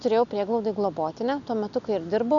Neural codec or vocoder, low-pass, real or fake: none; 7.2 kHz; real